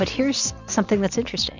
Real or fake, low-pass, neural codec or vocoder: real; 7.2 kHz; none